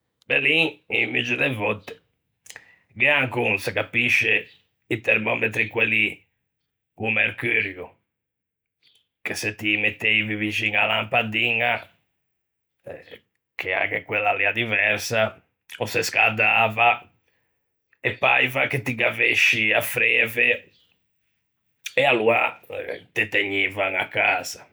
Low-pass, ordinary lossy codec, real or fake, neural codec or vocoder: none; none; real; none